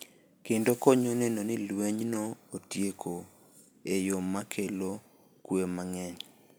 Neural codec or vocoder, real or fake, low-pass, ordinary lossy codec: none; real; none; none